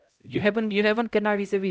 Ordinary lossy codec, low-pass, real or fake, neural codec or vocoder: none; none; fake; codec, 16 kHz, 0.5 kbps, X-Codec, HuBERT features, trained on LibriSpeech